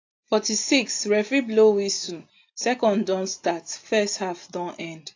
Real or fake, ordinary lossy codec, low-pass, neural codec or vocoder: real; AAC, 48 kbps; 7.2 kHz; none